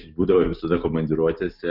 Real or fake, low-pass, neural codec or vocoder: real; 5.4 kHz; none